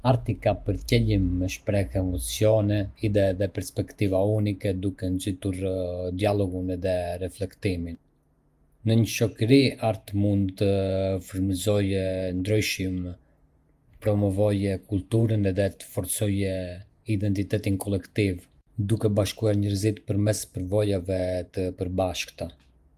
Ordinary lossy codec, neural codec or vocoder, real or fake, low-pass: Opus, 32 kbps; vocoder, 48 kHz, 128 mel bands, Vocos; fake; 14.4 kHz